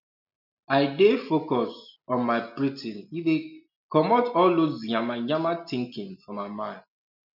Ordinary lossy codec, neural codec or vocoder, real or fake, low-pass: none; none; real; 5.4 kHz